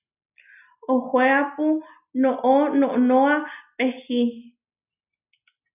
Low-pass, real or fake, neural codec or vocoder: 3.6 kHz; real; none